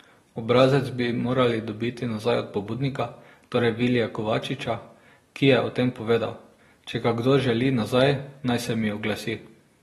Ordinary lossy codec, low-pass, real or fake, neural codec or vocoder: AAC, 32 kbps; 14.4 kHz; real; none